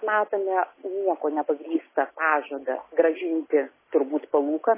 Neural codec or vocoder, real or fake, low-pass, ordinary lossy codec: none; real; 3.6 kHz; MP3, 16 kbps